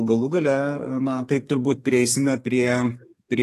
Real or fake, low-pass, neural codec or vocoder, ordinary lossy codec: fake; 14.4 kHz; codec, 32 kHz, 1.9 kbps, SNAC; AAC, 48 kbps